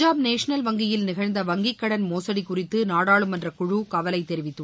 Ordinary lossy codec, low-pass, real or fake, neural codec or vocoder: none; none; real; none